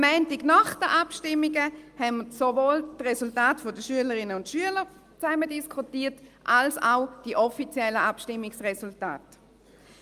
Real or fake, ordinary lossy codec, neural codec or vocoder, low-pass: real; Opus, 32 kbps; none; 14.4 kHz